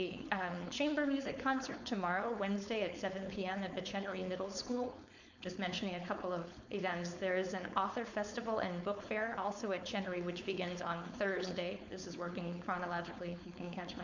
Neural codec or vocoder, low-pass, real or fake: codec, 16 kHz, 4.8 kbps, FACodec; 7.2 kHz; fake